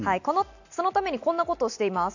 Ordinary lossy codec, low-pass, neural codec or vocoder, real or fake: none; 7.2 kHz; none; real